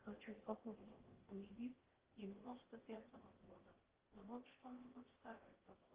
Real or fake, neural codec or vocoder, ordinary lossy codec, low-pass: fake; codec, 16 kHz in and 24 kHz out, 0.6 kbps, FocalCodec, streaming, 2048 codes; Opus, 16 kbps; 3.6 kHz